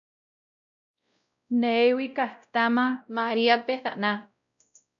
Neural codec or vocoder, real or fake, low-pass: codec, 16 kHz, 0.5 kbps, X-Codec, WavLM features, trained on Multilingual LibriSpeech; fake; 7.2 kHz